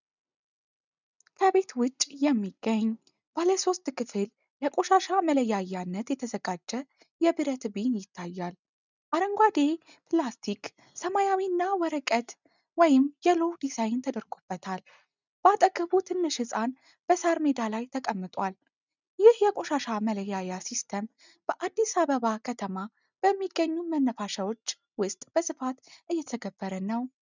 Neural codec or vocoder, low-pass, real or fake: none; 7.2 kHz; real